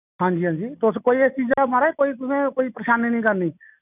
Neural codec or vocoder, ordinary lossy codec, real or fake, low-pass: none; none; real; 3.6 kHz